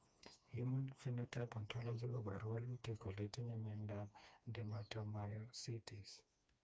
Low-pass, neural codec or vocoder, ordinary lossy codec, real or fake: none; codec, 16 kHz, 2 kbps, FreqCodec, smaller model; none; fake